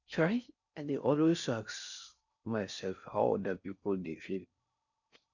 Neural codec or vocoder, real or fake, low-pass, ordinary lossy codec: codec, 16 kHz in and 24 kHz out, 0.6 kbps, FocalCodec, streaming, 4096 codes; fake; 7.2 kHz; none